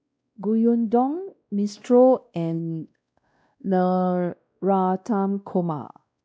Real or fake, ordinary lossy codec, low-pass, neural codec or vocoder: fake; none; none; codec, 16 kHz, 1 kbps, X-Codec, WavLM features, trained on Multilingual LibriSpeech